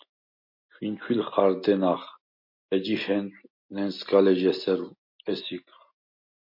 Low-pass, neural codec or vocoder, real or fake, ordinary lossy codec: 5.4 kHz; none; real; MP3, 32 kbps